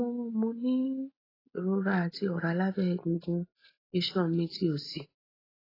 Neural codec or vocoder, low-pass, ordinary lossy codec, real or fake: none; 5.4 kHz; AAC, 24 kbps; real